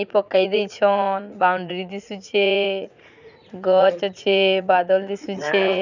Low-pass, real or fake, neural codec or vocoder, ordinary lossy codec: 7.2 kHz; fake; vocoder, 44.1 kHz, 80 mel bands, Vocos; none